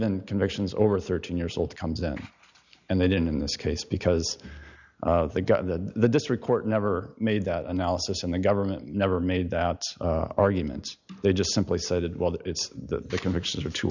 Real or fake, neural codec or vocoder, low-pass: real; none; 7.2 kHz